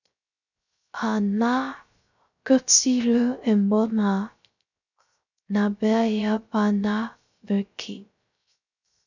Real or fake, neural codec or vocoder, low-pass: fake; codec, 16 kHz, 0.3 kbps, FocalCodec; 7.2 kHz